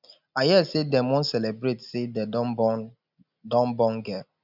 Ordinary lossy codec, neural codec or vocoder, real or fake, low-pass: none; none; real; 5.4 kHz